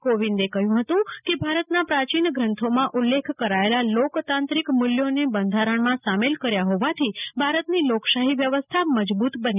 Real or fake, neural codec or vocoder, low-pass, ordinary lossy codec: real; none; 3.6 kHz; none